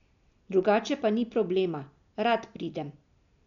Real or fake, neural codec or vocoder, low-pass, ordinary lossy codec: real; none; 7.2 kHz; none